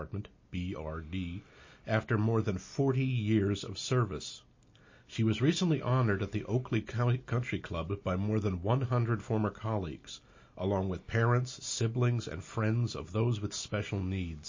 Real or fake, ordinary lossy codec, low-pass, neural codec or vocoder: real; MP3, 32 kbps; 7.2 kHz; none